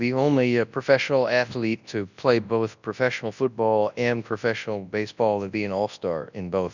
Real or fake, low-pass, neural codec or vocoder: fake; 7.2 kHz; codec, 24 kHz, 0.9 kbps, WavTokenizer, large speech release